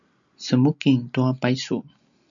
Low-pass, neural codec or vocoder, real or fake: 7.2 kHz; none; real